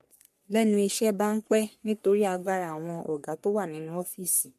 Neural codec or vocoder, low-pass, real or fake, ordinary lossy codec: codec, 44.1 kHz, 3.4 kbps, Pupu-Codec; 14.4 kHz; fake; MP3, 64 kbps